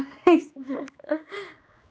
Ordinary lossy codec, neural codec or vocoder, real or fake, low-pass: none; codec, 16 kHz, 2 kbps, X-Codec, HuBERT features, trained on general audio; fake; none